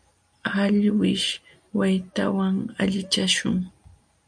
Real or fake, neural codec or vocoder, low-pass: real; none; 9.9 kHz